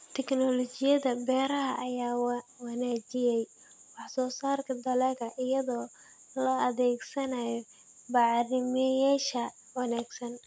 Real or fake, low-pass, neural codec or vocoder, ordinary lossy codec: real; none; none; none